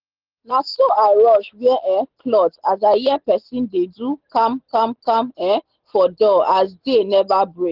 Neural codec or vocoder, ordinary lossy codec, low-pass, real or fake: none; Opus, 16 kbps; 5.4 kHz; real